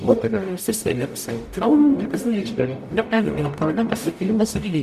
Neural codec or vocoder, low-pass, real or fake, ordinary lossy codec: codec, 44.1 kHz, 0.9 kbps, DAC; 14.4 kHz; fake; Opus, 64 kbps